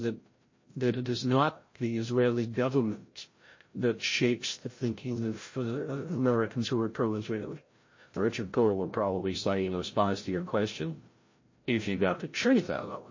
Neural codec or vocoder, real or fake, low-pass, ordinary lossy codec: codec, 16 kHz, 0.5 kbps, FreqCodec, larger model; fake; 7.2 kHz; MP3, 32 kbps